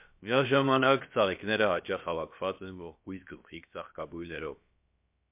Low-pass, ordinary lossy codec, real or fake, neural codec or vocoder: 3.6 kHz; MP3, 32 kbps; fake; codec, 16 kHz, about 1 kbps, DyCAST, with the encoder's durations